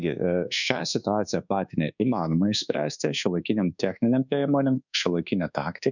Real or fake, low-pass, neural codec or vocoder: fake; 7.2 kHz; codec, 24 kHz, 1.2 kbps, DualCodec